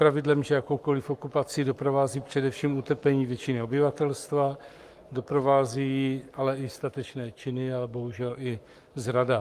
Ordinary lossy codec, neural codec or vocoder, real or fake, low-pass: Opus, 32 kbps; codec, 44.1 kHz, 7.8 kbps, Pupu-Codec; fake; 14.4 kHz